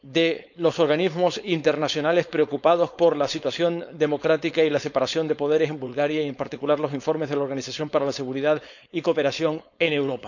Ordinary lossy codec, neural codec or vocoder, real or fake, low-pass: none; codec, 16 kHz, 4.8 kbps, FACodec; fake; 7.2 kHz